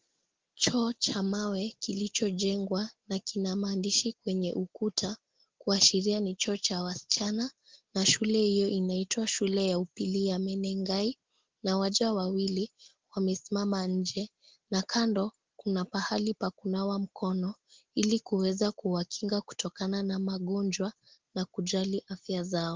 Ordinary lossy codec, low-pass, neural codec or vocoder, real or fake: Opus, 16 kbps; 7.2 kHz; none; real